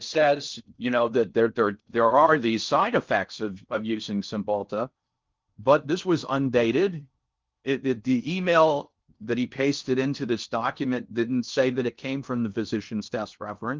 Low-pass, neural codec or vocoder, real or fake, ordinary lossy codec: 7.2 kHz; codec, 16 kHz in and 24 kHz out, 0.6 kbps, FocalCodec, streaming, 4096 codes; fake; Opus, 24 kbps